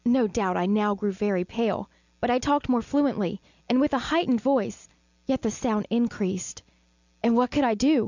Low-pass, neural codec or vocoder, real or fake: 7.2 kHz; none; real